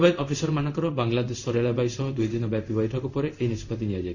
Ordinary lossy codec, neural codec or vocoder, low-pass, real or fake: none; codec, 16 kHz in and 24 kHz out, 1 kbps, XY-Tokenizer; 7.2 kHz; fake